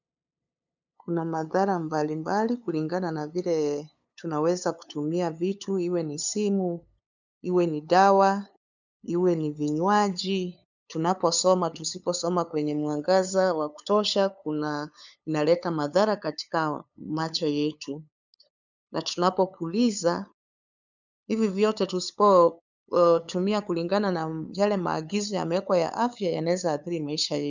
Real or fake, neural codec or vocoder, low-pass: fake; codec, 16 kHz, 8 kbps, FunCodec, trained on LibriTTS, 25 frames a second; 7.2 kHz